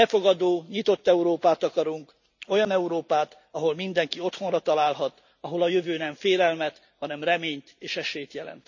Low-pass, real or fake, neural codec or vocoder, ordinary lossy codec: 7.2 kHz; real; none; none